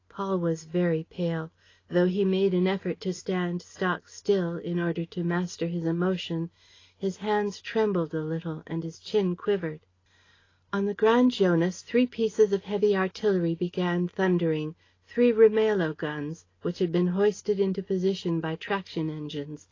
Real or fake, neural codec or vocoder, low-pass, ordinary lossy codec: real; none; 7.2 kHz; AAC, 32 kbps